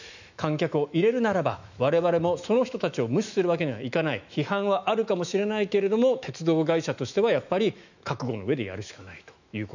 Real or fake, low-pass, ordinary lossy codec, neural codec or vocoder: fake; 7.2 kHz; none; autoencoder, 48 kHz, 128 numbers a frame, DAC-VAE, trained on Japanese speech